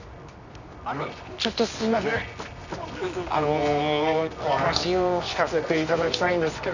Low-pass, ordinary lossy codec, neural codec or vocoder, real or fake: 7.2 kHz; none; codec, 24 kHz, 0.9 kbps, WavTokenizer, medium music audio release; fake